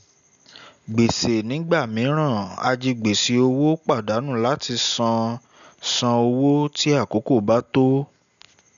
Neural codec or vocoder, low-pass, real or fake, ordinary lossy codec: none; 7.2 kHz; real; none